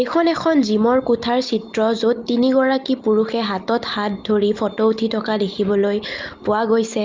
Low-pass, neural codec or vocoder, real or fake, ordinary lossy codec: 7.2 kHz; none; real; Opus, 24 kbps